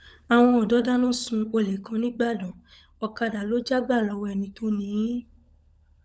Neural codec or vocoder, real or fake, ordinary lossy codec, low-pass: codec, 16 kHz, 16 kbps, FunCodec, trained on LibriTTS, 50 frames a second; fake; none; none